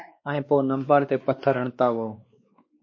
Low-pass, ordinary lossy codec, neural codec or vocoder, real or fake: 7.2 kHz; MP3, 32 kbps; codec, 16 kHz, 2 kbps, X-Codec, WavLM features, trained on Multilingual LibriSpeech; fake